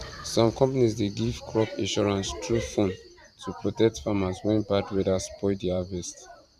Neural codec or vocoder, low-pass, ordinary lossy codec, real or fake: vocoder, 44.1 kHz, 128 mel bands every 512 samples, BigVGAN v2; 14.4 kHz; none; fake